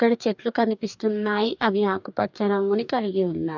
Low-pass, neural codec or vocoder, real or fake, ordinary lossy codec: 7.2 kHz; codec, 44.1 kHz, 2.6 kbps, DAC; fake; none